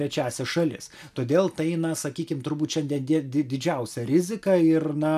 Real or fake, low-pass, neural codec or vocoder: real; 14.4 kHz; none